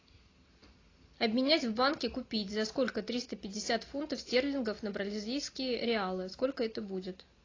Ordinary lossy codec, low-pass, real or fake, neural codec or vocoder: AAC, 32 kbps; 7.2 kHz; real; none